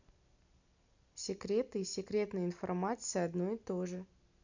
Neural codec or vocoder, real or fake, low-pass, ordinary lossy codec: none; real; 7.2 kHz; none